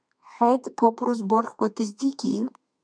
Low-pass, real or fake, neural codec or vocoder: 9.9 kHz; fake; codec, 32 kHz, 1.9 kbps, SNAC